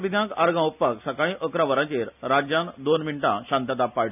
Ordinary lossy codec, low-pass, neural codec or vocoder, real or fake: none; 3.6 kHz; none; real